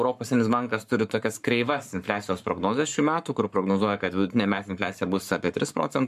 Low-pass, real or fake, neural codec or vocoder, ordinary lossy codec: 14.4 kHz; fake; codec, 44.1 kHz, 7.8 kbps, Pupu-Codec; MP3, 96 kbps